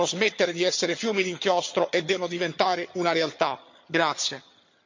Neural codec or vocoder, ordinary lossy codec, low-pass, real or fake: vocoder, 22.05 kHz, 80 mel bands, HiFi-GAN; MP3, 48 kbps; 7.2 kHz; fake